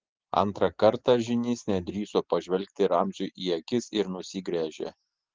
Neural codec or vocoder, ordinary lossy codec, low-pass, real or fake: none; Opus, 16 kbps; 7.2 kHz; real